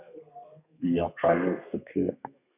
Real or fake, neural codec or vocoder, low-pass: fake; codec, 32 kHz, 1.9 kbps, SNAC; 3.6 kHz